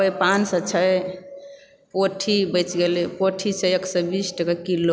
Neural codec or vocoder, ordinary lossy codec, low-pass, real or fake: none; none; none; real